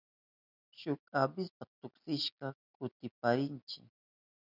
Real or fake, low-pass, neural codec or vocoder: real; 5.4 kHz; none